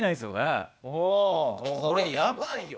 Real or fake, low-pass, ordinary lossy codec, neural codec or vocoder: fake; none; none; codec, 16 kHz, 0.8 kbps, ZipCodec